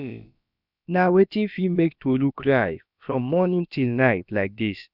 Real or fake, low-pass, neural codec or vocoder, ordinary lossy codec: fake; 5.4 kHz; codec, 16 kHz, about 1 kbps, DyCAST, with the encoder's durations; none